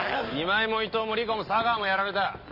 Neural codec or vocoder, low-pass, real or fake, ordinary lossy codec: none; 5.4 kHz; real; none